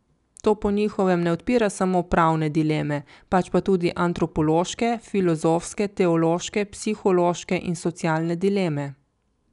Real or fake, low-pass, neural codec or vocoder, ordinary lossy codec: real; 10.8 kHz; none; none